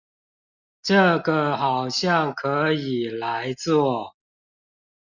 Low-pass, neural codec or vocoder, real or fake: 7.2 kHz; none; real